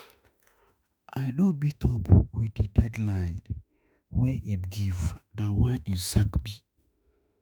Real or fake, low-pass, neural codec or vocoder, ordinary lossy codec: fake; none; autoencoder, 48 kHz, 32 numbers a frame, DAC-VAE, trained on Japanese speech; none